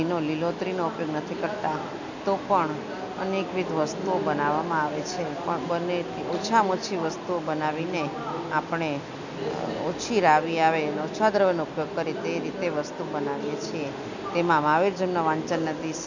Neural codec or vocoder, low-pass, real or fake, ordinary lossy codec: none; 7.2 kHz; real; none